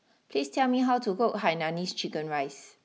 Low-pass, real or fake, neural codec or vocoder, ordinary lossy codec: none; real; none; none